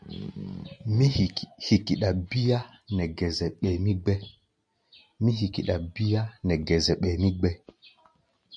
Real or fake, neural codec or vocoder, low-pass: real; none; 9.9 kHz